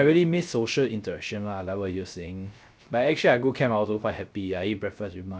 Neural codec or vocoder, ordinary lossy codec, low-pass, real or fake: codec, 16 kHz, 0.3 kbps, FocalCodec; none; none; fake